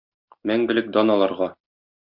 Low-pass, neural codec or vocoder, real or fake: 5.4 kHz; none; real